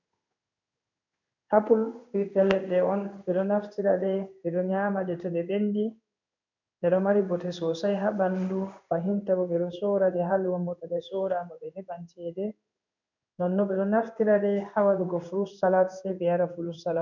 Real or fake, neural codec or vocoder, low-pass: fake; codec, 16 kHz in and 24 kHz out, 1 kbps, XY-Tokenizer; 7.2 kHz